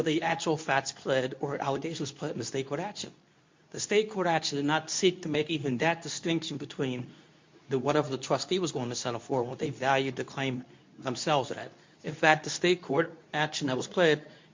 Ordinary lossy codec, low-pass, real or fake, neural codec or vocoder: MP3, 48 kbps; 7.2 kHz; fake; codec, 24 kHz, 0.9 kbps, WavTokenizer, medium speech release version 2